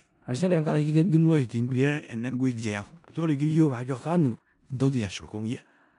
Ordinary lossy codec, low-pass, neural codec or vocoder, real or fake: none; 10.8 kHz; codec, 16 kHz in and 24 kHz out, 0.4 kbps, LongCat-Audio-Codec, four codebook decoder; fake